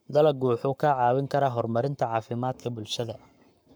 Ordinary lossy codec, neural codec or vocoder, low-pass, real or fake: none; codec, 44.1 kHz, 7.8 kbps, Pupu-Codec; none; fake